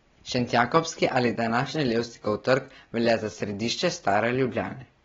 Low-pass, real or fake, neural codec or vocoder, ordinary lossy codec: 7.2 kHz; real; none; AAC, 24 kbps